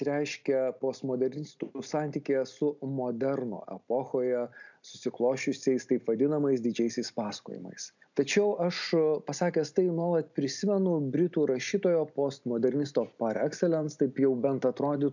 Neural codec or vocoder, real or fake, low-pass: none; real; 7.2 kHz